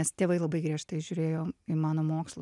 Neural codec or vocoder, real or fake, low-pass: none; real; 10.8 kHz